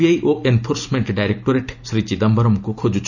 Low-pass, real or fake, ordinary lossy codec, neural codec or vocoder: 7.2 kHz; real; none; none